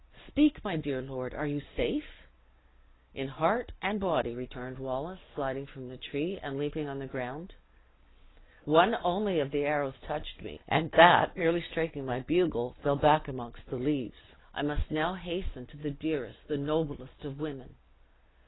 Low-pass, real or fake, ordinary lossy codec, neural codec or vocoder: 7.2 kHz; real; AAC, 16 kbps; none